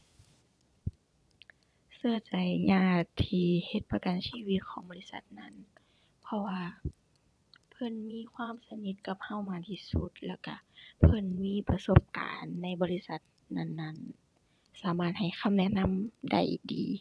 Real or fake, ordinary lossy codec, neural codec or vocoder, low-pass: fake; none; vocoder, 22.05 kHz, 80 mel bands, Vocos; none